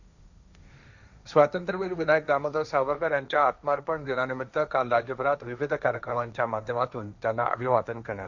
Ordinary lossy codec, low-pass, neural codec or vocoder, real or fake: none; 7.2 kHz; codec, 16 kHz, 1.1 kbps, Voila-Tokenizer; fake